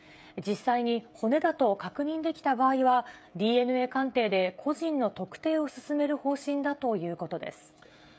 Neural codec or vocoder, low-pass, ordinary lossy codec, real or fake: codec, 16 kHz, 16 kbps, FreqCodec, smaller model; none; none; fake